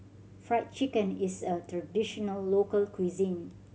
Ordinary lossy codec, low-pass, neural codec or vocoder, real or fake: none; none; none; real